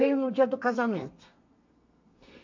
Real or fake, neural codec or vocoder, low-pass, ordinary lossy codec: fake; codec, 32 kHz, 1.9 kbps, SNAC; 7.2 kHz; MP3, 48 kbps